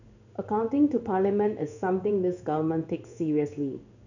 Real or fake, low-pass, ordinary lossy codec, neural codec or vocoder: fake; 7.2 kHz; none; codec, 16 kHz in and 24 kHz out, 1 kbps, XY-Tokenizer